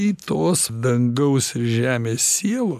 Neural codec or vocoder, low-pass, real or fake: none; 14.4 kHz; real